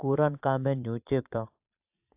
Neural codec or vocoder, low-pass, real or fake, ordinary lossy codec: vocoder, 22.05 kHz, 80 mel bands, Vocos; 3.6 kHz; fake; AAC, 32 kbps